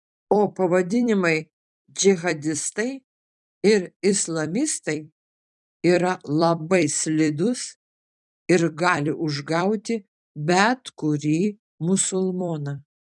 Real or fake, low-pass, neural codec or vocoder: fake; 10.8 kHz; vocoder, 44.1 kHz, 128 mel bands every 256 samples, BigVGAN v2